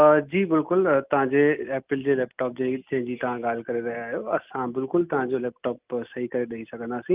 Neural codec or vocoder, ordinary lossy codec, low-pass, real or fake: none; Opus, 24 kbps; 3.6 kHz; real